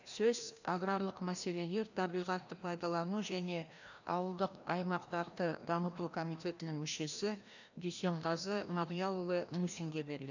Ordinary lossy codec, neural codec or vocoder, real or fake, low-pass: none; codec, 16 kHz, 1 kbps, FreqCodec, larger model; fake; 7.2 kHz